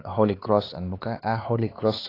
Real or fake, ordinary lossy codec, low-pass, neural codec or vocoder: fake; AAC, 24 kbps; 5.4 kHz; codec, 16 kHz, 4 kbps, X-Codec, HuBERT features, trained on LibriSpeech